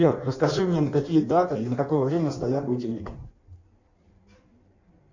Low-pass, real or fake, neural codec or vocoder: 7.2 kHz; fake; codec, 16 kHz in and 24 kHz out, 1.1 kbps, FireRedTTS-2 codec